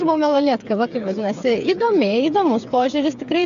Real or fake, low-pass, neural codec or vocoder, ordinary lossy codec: fake; 7.2 kHz; codec, 16 kHz, 8 kbps, FreqCodec, smaller model; MP3, 96 kbps